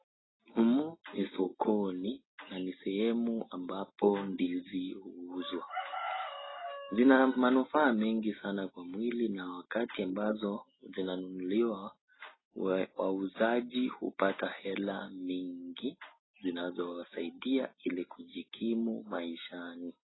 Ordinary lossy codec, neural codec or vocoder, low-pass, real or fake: AAC, 16 kbps; none; 7.2 kHz; real